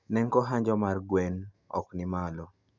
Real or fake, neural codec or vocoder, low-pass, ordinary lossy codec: real; none; 7.2 kHz; none